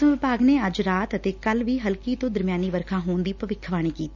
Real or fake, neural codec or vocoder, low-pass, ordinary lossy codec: real; none; 7.2 kHz; none